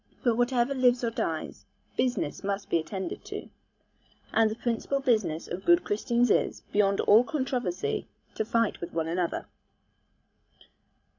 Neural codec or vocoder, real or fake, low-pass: codec, 16 kHz, 16 kbps, FreqCodec, larger model; fake; 7.2 kHz